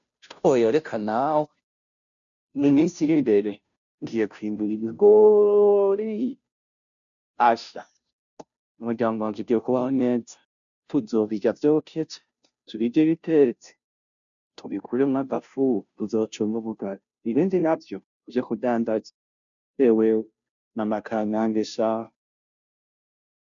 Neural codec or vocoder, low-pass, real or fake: codec, 16 kHz, 0.5 kbps, FunCodec, trained on Chinese and English, 25 frames a second; 7.2 kHz; fake